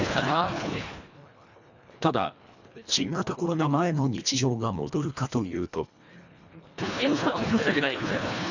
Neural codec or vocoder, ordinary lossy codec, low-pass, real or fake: codec, 24 kHz, 1.5 kbps, HILCodec; none; 7.2 kHz; fake